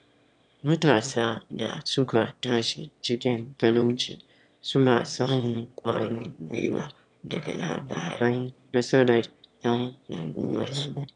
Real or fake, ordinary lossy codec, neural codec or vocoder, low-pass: fake; MP3, 96 kbps; autoencoder, 22.05 kHz, a latent of 192 numbers a frame, VITS, trained on one speaker; 9.9 kHz